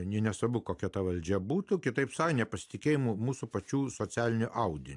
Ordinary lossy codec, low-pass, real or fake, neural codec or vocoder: AAC, 64 kbps; 10.8 kHz; real; none